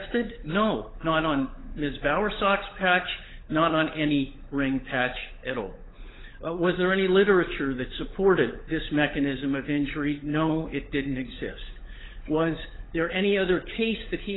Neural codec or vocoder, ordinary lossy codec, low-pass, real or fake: codec, 16 kHz, 16 kbps, FunCodec, trained on LibriTTS, 50 frames a second; AAC, 16 kbps; 7.2 kHz; fake